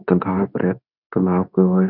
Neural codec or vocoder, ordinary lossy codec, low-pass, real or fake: codec, 24 kHz, 0.9 kbps, WavTokenizer, medium speech release version 2; none; 5.4 kHz; fake